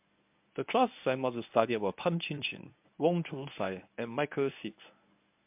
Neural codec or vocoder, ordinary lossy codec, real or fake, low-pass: codec, 24 kHz, 0.9 kbps, WavTokenizer, medium speech release version 1; MP3, 32 kbps; fake; 3.6 kHz